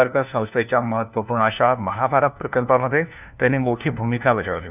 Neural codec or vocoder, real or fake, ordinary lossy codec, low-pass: codec, 16 kHz, 1 kbps, FunCodec, trained on LibriTTS, 50 frames a second; fake; none; 3.6 kHz